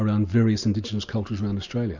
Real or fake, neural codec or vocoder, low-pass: real; none; 7.2 kHz